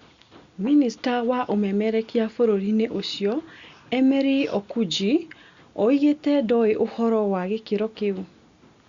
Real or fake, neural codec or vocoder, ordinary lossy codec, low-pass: real; none; Opus, 64 kbps; 7.2 kHz